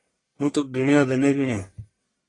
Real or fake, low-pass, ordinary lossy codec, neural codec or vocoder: fake; 10.8 kHz; AAC, 32 kbps; codec, 44.1 kHz, 1.7 kbps, Pupu-Codec